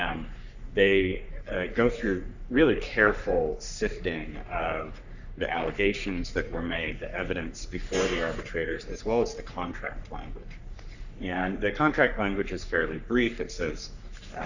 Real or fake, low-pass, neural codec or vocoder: fake; 7.2 kHz; codec, 44.1 kHz, 3.4 kbps, Pupu-Codec